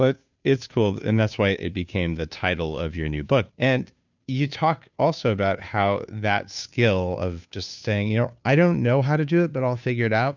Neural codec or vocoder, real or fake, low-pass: codec, 16 kHz, 2 kbps, FunCodec, trained on Chinese and English, 25 frames a second; fake; 7.2 kHz